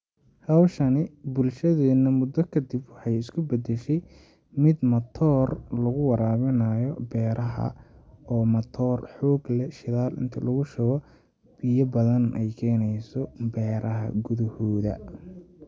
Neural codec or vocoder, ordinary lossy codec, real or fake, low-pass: none; none; real; none